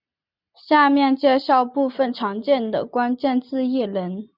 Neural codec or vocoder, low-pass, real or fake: none; 5.4 kHz; real